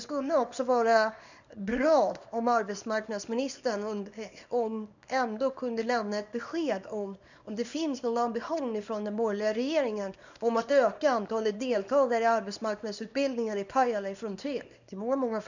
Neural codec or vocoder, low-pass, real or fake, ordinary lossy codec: codec, 24 kHz, 0.9 kbps, WavTokenizer, small release; 7.2 kHz; fake; none